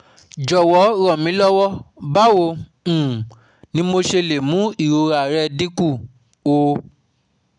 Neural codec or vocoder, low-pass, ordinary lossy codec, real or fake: none; 9.9 kHz; none; real